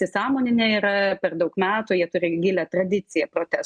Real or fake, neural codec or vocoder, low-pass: real; none; 9.9 kHz